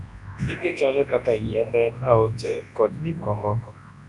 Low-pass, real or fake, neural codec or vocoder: 10.8 kHz; fake; codec, 24 kHz, 0.9 kbps, WavTokenizer, large speech release